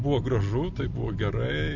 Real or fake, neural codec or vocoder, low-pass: real; none; 7.2 kHz